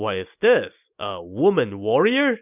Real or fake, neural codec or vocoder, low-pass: real; none; 3.6 kHz